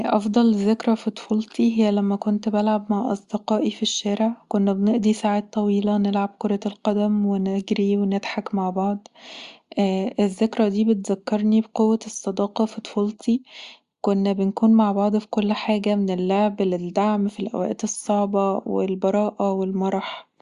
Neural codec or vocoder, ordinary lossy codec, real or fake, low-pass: none; Opus, 64 kbps; real; 10.8 kHz